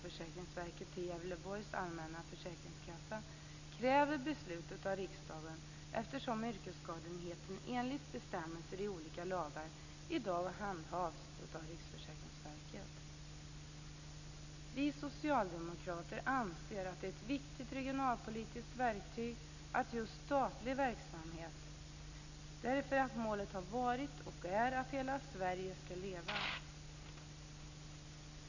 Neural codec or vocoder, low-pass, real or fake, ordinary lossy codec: none; 7.2 kHz; real; none